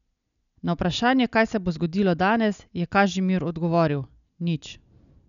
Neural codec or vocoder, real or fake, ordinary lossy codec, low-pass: none; real; none; 7.2 kHz